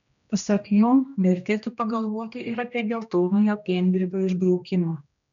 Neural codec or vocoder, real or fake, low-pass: codec, 16 kHz, 1 kbps, X-Codec, HuBERT features, trained on general audio; fake; 7.2 kHz